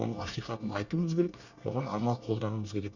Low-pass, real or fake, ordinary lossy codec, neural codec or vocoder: 7.2 kHz; fake; Opus, 64 kbps; codec, 24 kHz, 1 kbps, SNAC